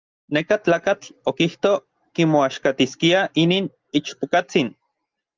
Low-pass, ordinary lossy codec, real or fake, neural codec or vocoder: 7.2 kHz; Opus, 32 kbps; real; none